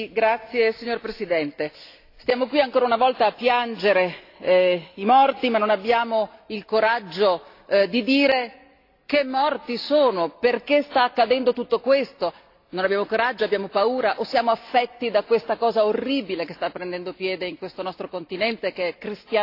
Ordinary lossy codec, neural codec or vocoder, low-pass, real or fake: AAC, 32 kbps; none; 5.4 kHz; real